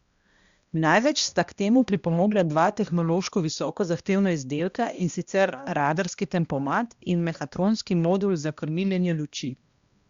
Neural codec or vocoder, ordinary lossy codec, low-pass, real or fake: codec, 16 kHz, 1 kbps, X-Codec, HuBERT features, trained on balanced general audio; Opus, 64 kbps; 7.2 kHz; fake